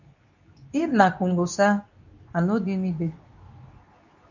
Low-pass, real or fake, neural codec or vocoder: 7.2 kHz; fake; codec, 24 kHz, 0.9 kbps, WavTokenizer, medium speech release version 2